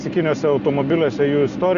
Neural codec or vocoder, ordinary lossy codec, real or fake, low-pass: none; Opus, 64 kbps; real; 7.2 kHz